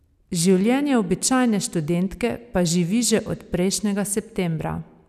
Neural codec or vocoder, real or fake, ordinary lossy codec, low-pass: none; real; none; 14.4 kHz